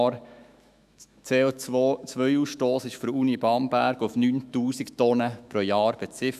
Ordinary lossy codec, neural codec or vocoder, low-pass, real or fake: none; autoencoder, 48 kHz, 128 numbers a frame, DAC-VAE, trained on Japanese speech; 14.4 kHz; fake